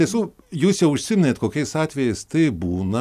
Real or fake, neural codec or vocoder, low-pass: real; none; 14.4 kHz